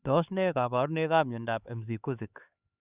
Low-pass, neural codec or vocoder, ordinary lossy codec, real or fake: 3.6 kHz; codec, 16 kHz, 4 kbps, X-Codec, HuBERT features, trained on LibriSpeech; Opus, 64 kbps; fake